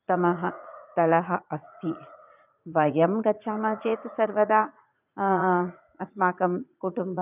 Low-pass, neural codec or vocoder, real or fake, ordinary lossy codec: 3.6 kHz; vocoder, 44.1 kHz, 80 mel bands, Vocos; fake; none